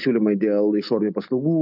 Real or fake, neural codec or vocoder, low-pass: real; none; 5.4 kHz